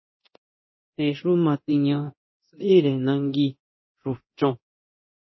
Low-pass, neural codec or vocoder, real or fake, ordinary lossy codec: 7.2 kHz; codec, 24 kHz, 0.9 kbps, DualCodec; fake; MP3, 24 kbps